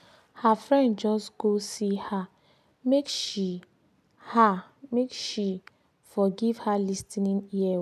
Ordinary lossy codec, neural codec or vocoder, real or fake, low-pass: none; none; real; 14.4 kHz